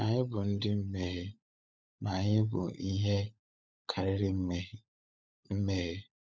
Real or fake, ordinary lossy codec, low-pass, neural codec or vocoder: fake; none; none; codec, 16 kHz, 16 kbps, FunCodec, trained on LibriTTS, 50 frames a second